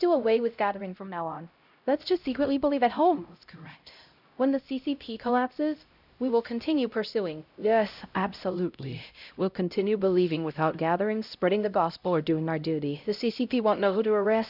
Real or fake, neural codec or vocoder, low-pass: fake; codec, 16 kHz, 0.5 kbps, X-Codec, HuBERT features, trained on LibriSpeech; 5.4 kHz